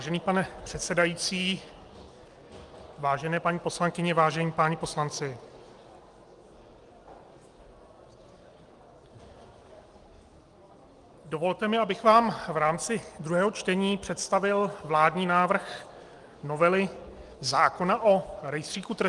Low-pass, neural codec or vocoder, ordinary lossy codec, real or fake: 10.8 kHz; none; Opus, 32 kbps; real